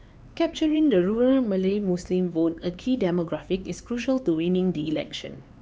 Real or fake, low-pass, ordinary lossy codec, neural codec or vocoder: fake; none; none; codec, 16 kHz, 4 kbps, X-Codec, HuBERT features, trained on LibriSpeech